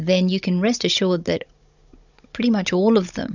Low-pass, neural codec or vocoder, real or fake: 7.2 kHz; codec, 16 kHz, 16 kbps, FunCodec, trained on Chinese and English, 50 frames a second; fake